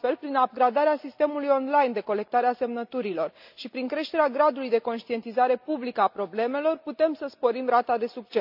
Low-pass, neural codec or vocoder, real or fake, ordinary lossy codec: 5.4 kHz; none; real; none